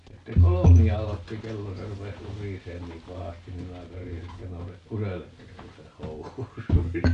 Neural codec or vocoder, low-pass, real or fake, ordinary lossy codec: none; 10.8 kHz; real; AAC, 64 kbps